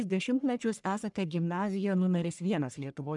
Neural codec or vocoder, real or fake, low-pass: codec, 44.1 kHz, 1.7 kbps, Pupu-Codec; fake; 10.8 kHz